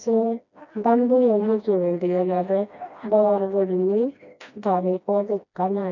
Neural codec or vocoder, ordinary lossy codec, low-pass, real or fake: codec, 16 kHz, 1 kbps, FreqCodec, smaller model; none; 7.2 kHz; fake